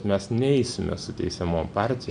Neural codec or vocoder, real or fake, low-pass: none; real; 9.9 kHz